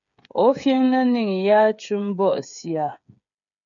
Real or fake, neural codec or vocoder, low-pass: fake; codec, 16 kHz, 8 kbps, FreqCodec, smaller model; 7.2 kHz